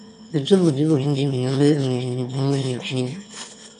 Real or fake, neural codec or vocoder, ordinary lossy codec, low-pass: fake; autoencoder, 22.05 kHz, a latent of 192 numbers a frame, VITS, trained on one speaker; AAC, 64 kbps; 9.9 kHz